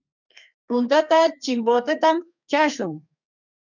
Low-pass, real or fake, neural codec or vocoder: 7.2 kHz; fake; codec, 32 kHz, 1.9 kbps, SNAC